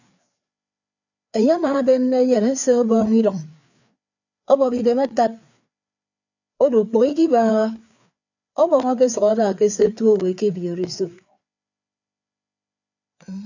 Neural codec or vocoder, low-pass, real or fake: codec, 16 kHz, 4 kbps, FreqCodec, larger model; 7.2 kHz; fake